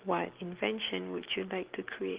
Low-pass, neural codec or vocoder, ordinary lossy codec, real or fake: 3.6 kHz; none; Opus, 16 kbps; real